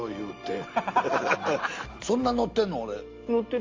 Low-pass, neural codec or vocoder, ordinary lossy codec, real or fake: 7.2 kHz; none; Opus, 32 kbps; real